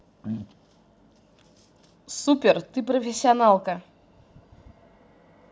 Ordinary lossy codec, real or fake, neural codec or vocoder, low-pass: none; real; none; none